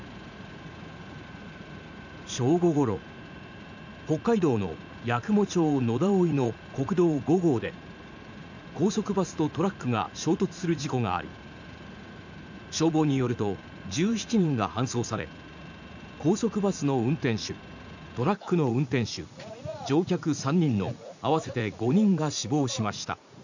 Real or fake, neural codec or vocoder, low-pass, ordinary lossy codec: fake; vocoder, 44.1 kHz, 80 mel bands, Vocos; 7.2 kHz; none